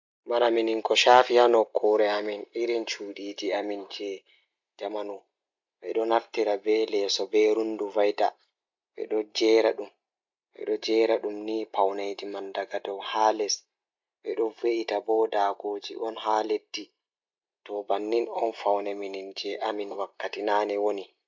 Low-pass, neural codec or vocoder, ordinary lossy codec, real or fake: 7.2 kHz; none; MP3, 64 kbps; real